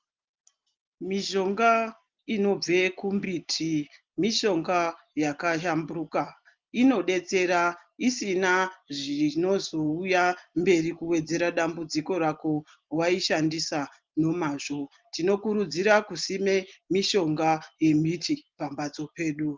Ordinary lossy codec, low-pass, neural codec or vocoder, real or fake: Opus, 32 kbps; 7.2 kHz; none; real